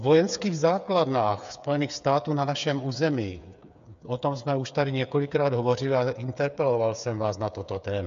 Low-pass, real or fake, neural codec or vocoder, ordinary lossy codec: 7.2 kHz; fake; codec, 16 kHz, 8 kbps, FreqCodec, smaller model; MP3, 64 kbps